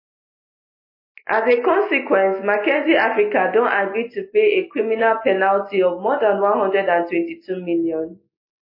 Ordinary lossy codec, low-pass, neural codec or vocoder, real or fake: MP3, 24 kbps; 5.4 kHz; none; real